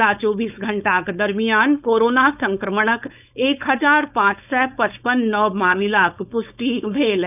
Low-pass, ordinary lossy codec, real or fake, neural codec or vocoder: 3.6 kHz; none; fake; codec, 16 kHz, 4.8 kbps, FACodec